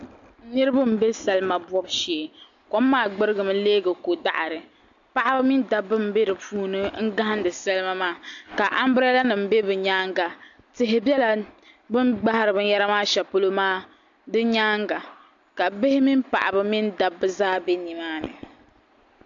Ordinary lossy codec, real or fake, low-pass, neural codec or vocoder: AAC, 64 kbps; real; 7.2 kHz; none